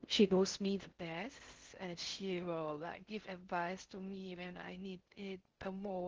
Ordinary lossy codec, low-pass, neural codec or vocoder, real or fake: Opus, 16 kbps; 7.2 kHz; codec, 16 kHz in and 24 kHz out, 0.6 kbps, FocalCodec, streaming, 4096 codes; fake